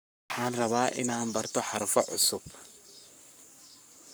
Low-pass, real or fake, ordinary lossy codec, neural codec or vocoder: none; fake; none; codec, 44.1 kHz, 7.8 kbps, Pupu-Codec